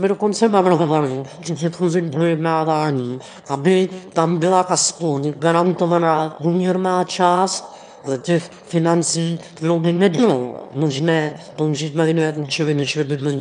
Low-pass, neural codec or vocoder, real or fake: 9.9 kHz; autoencoder, 22.05 kHz, a latent of 192 numbers a frame, VITS, trained on one speaker; fake